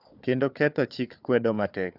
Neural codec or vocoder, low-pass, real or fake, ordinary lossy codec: codec, 16 kHz, 4 kbps, FunCodec, trained on Chinese and English, 50 frames a second; 5.4 kHz; fake; none